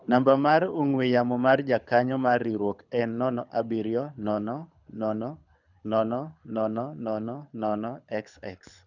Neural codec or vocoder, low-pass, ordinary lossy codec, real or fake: codec, 24 kHz, 6 kbps, HILCodec; 7.2 kHz; none; fake